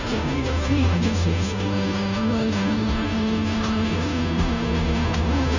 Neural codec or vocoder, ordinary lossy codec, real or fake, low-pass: codec, 16 kHz, 0.5 kbps, FunCodec, trained on Chinese and English, 25 frames a second; none; fake; 7.2 kHz